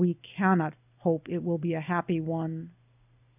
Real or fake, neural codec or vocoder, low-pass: real; none; 3.6 kHz